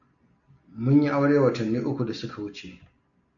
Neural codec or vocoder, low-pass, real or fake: none; 7.2 kHz; real